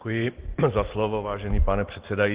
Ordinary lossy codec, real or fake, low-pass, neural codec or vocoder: Opus, 16 kbps; real; 3.6 kHz; none